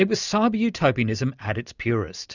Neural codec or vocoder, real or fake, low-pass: none; real; 7.2 kHz